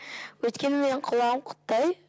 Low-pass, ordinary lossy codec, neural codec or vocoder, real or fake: none; none; none; real